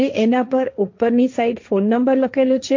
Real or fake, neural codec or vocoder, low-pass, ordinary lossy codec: fake; codec, 16 kHz, 1.1 kbps, Voila-Tokenizer; 7.2 kHz; MP3, 48 kbps